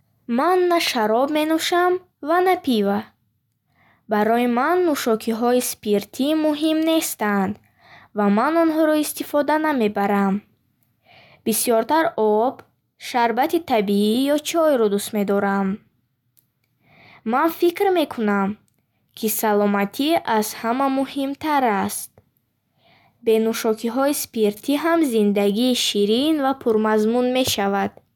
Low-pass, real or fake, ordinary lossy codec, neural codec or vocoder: 19.8 kHz; real; none; none